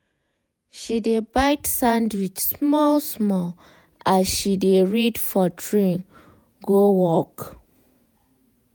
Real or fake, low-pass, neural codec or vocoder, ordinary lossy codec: fake; none; vocoder, 48 kHz, 128 mel bands, Vocos; none